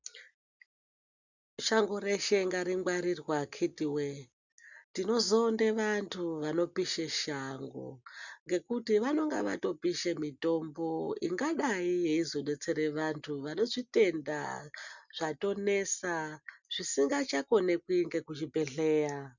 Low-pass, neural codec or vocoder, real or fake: 7.2 kHz; none; real